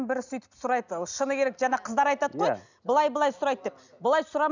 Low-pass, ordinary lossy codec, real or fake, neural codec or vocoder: 7.2 kHz; none; real; none